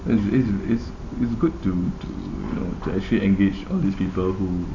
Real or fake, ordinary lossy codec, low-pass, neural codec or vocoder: real; none; 7.2 kHz; none